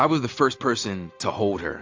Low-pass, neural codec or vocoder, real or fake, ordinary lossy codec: 7.2 kHz; none; real; AAC, 48 kbps